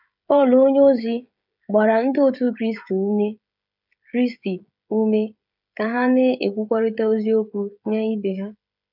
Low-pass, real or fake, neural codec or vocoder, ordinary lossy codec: 5.4 kHz; fake; codec, 16 kHz, 16 kbps, FreqCodec, smaller model; none